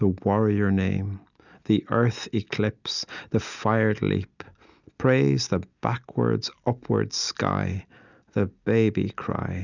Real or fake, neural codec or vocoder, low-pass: real; none; 7.2 kHz